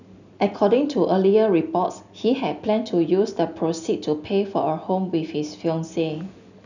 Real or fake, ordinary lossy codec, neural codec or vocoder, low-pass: real; none; none; 7.2 kHz